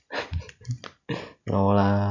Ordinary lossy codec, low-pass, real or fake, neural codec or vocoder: none; 7.2 kHz; real; none